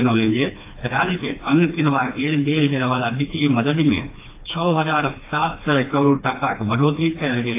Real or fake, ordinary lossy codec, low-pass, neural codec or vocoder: fake; AAC, 24 kbps; 3.6 kHz; codec, 16 kHz, 2 kbps, FreqCodec, smaller model